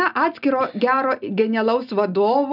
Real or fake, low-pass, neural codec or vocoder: real; 5.4 kHz; none